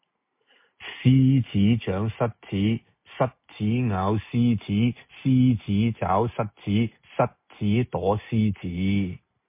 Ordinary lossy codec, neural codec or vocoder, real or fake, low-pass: MP3, 24 kbps; none; real; 3.6 kHz